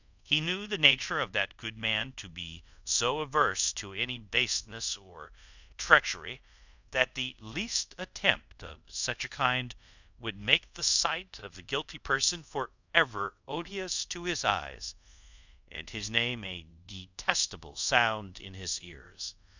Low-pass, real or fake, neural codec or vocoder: 7.2 kHz; fake; codec, 24 kHz, 0.5 kbps, DualCodec